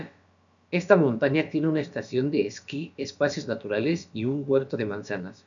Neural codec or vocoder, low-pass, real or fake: codec, 16 kHz, about 1 kbps, DyCAST, with the encoder's durations; 7.2 kHz; fake